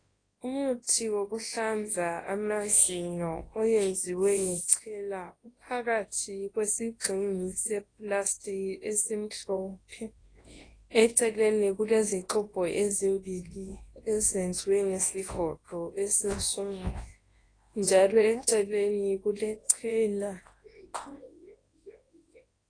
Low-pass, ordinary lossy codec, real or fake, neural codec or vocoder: 9.9 kHz; AAC, 32 kbps; fake; codec, 24 kHz, 0.9 kbps, WavTokenizer, large speech release